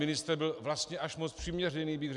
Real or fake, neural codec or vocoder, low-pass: real; none; 10.8 kHz